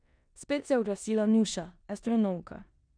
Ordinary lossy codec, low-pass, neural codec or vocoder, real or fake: none; 9.9 kHz; codec, 16 kHz in and 24 kHz out, 0.9 kbps, LongCat-Audio-Codec, four codebook decoder; fake